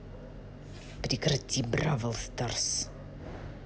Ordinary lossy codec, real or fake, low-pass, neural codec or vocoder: none; real; none; none